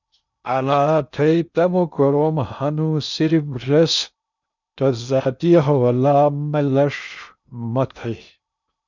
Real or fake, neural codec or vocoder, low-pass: fake; codec, 16 kHz in and 24 kHz out, 0.6 kbps, FocalCodec, streaming, 2048 codes; 7.2 kHz